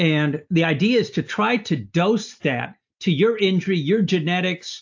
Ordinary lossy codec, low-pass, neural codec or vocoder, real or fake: AAC, 48 kbps; 7.2 kHz; none; real